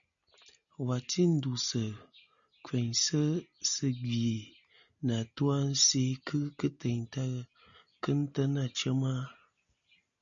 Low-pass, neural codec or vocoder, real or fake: 7.2 kHz; none; real